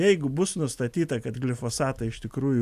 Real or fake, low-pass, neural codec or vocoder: real; 14.4 kHz; none